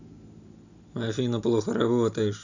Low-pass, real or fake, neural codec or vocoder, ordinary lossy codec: 7.2 kHz; real; none; none